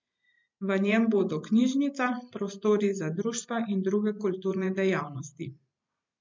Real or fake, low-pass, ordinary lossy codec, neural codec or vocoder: fake; 7.2 kHz; MP3, 64 kbps; vocoder, 44.1 kHz, 128 mel bands every 512 samples, BigVGAN v2